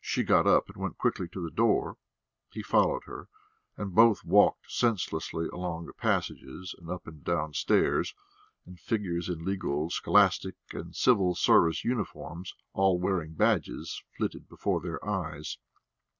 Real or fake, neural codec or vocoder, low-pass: real; none; 7.2 kHz